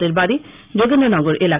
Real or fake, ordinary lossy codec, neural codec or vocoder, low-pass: real; Opus, 24 kbps; none; 3.6 kHz